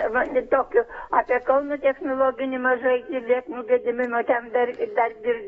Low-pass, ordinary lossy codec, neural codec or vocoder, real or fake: 19.8 kHz; AAC, 24 kbps; codec, 44.1 kHz, 7.8 kbps, DAC; fake